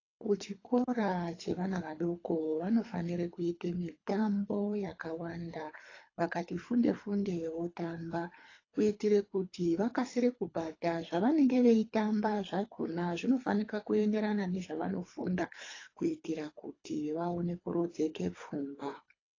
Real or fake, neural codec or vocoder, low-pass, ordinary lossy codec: fake; codec, 24 kHz, 3 kbps, HILCodec; 7.2 kHz; AAC, 32 kbps